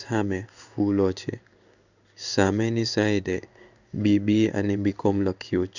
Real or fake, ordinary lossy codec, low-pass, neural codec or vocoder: fake; none; 7.2 kHz; codec, 16 kHz in and 24 kHz out, 1 kbps, XY-Tokenizer